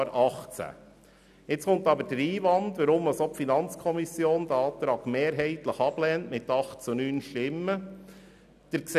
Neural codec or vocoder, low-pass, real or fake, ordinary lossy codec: none; 14.4 kHz; real; none